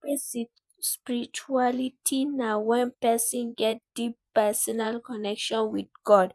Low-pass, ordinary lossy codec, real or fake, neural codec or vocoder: none; none; fake; vocoder, 24 kHz, 100 mel bands, Vocos